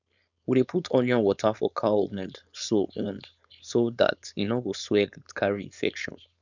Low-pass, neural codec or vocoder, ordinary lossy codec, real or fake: 7.2 kHz; codec, 16 kHz, 4.8 kbps, FACodec; none; fake